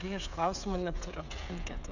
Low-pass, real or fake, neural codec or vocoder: 7.2 kHz; fake; codec, 16 kHz, 16 kbps, FreqCodec, smaller model